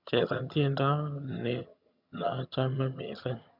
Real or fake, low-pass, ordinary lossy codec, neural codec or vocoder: fake; 5.4 kHz; none; vocoder, 22.05 kHz, 80 mel bands, HiFi-GAN